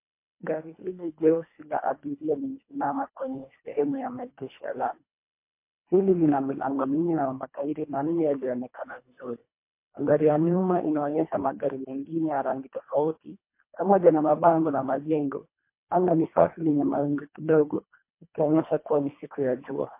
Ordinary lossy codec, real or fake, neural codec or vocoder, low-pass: MP3, 24 kbps; fake; codec, 24 kHz, 1.5 kbps, HILCodec; 3.6 kHz